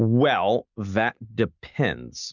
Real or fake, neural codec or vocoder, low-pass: real; none; 7.2 kHz